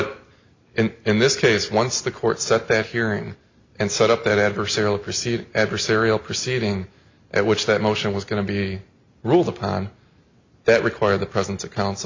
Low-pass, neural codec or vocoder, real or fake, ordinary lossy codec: 7.2 kHz; none; real; MP3, 64 kbps